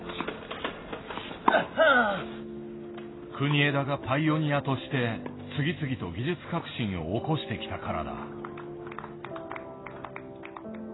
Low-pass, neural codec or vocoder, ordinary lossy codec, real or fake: 7.2 kHz; none; AAC, 16 kbps; real